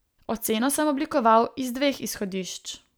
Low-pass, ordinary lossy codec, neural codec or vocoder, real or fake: none; none; none; real